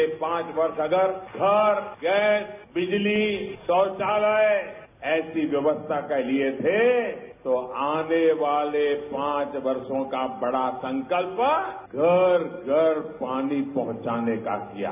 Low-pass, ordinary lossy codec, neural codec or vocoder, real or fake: 3.6 kHz; none; none; real